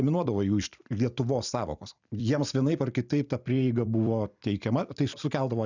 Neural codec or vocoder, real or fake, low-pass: vocoder, 44.1 kHz, 128 mel bands every 256 samples, BigVGAN v2; fake; 7.2 kHz